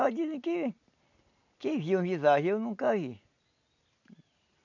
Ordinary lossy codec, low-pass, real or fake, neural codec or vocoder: none; 7.2 kHz; real; none